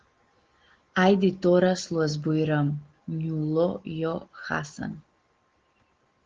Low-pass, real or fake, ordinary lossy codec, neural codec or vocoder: 7.2 kHz; real; Opus, 16 kbps; none